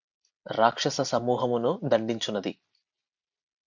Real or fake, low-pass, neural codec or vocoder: real; 7.2 kHz; none